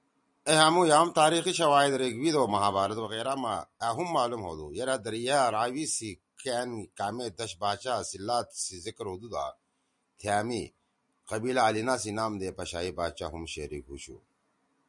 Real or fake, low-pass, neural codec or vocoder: real; 10.8 kHz; none